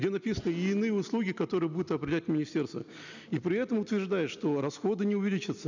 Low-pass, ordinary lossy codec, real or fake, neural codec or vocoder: 7.2 kHz; none; real; none